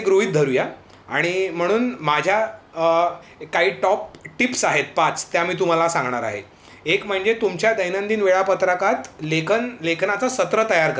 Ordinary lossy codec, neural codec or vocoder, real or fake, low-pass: none; none; real; none